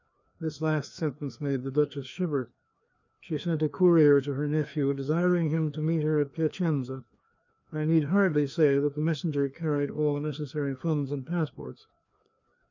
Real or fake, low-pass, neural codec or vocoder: fake; 7.2 kHz; codec, 16 kHz, 2 kbps, FreqCodec, larger model